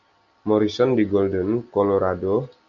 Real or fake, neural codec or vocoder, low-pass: real; none; 7.2 kHz